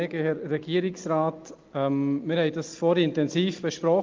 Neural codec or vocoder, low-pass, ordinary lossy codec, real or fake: none; 7.2 kHz; Opus, 32 kbps; real